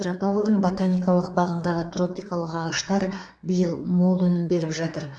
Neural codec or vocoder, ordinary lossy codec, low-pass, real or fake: codec, 24 kHz, 1 kbps, SNAC; AAC, 64 kbps; 9.9 kHz; fake